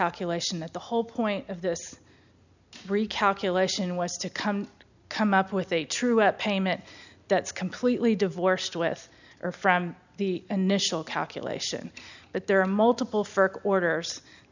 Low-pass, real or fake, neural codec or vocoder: 7.2 kHz; real; none